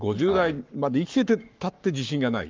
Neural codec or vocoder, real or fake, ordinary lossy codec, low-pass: codec, 44.1 kHz, 7.8 kbps, Pupu-Codec; fake; Opus, 32 kbps; 7.2 kHz